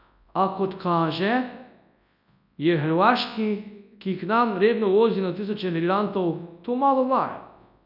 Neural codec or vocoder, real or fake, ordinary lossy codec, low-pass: codec, 24 kHz, 0.9 kbps, WavTokenizer, large speech release; fake; none; 5.4 kHz